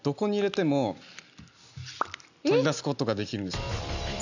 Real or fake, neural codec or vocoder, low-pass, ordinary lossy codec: real; none; 7.2 kHz; none